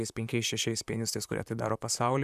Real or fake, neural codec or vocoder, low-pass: fake; vocoder, 44.1 kHz, 128 mel bands, Pupu-Vocoder; 14.4 kHz